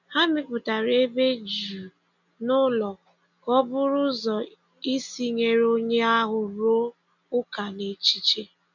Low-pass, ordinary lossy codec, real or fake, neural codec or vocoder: 7.2 kHz; none; real; none